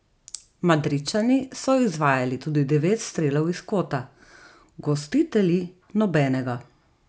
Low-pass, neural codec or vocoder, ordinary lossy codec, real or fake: none; none; none; real